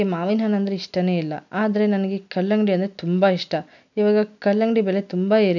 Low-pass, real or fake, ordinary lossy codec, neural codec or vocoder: 7.2 kHz; real; none; none